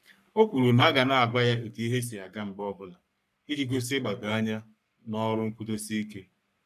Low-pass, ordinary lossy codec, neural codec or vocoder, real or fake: 14.4 kHz; none; codec, 44.1 kHz, 3.4 kbps, Pupu-Codec; fake